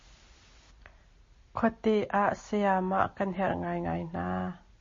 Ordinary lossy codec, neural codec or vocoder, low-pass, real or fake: MP3, 32 kbps; none; 7.2 kHz; real